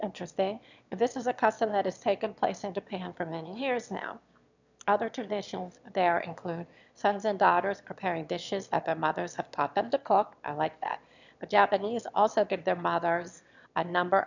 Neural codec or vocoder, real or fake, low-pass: autoencoder, 22.05 kHz, a latent of 192 numbers a frame, VITS, trained on one speaker; fake; 7.2 kHz